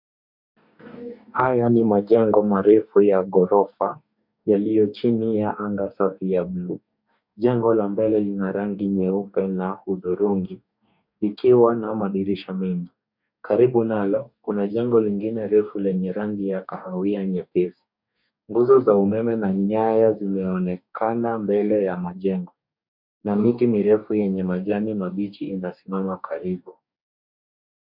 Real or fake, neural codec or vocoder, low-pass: fake; codec, 44.1 kHz, 2.6 kbps, DAC; 5.4 kHz